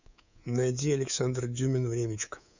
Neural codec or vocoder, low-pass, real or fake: codec, 24 kHz, 3.1 kbps, DualCodec; 7.2 kHz; fake